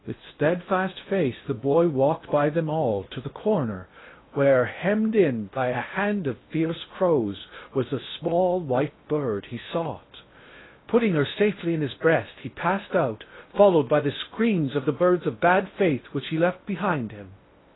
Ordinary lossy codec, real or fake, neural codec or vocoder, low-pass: AAC, 16 kbps; fake; codec, 16 kHz in and 24 kHz out, 0.6 kbps, FocalCodec, streaming, 2048 codes; 7.2 kHz